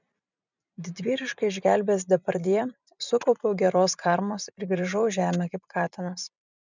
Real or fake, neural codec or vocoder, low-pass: fake; vocoder, 44.1 kHz, 128 mel bands every 256 samples, BigVGAN v2; 7.2 kHz